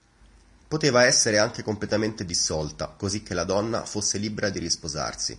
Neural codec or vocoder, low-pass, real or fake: none; 10.8 kHz; real